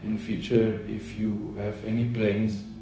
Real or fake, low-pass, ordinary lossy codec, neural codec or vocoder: fake; none; none; codec, 16 kHz, 0.4 kbps, LongCat-Audio-Codec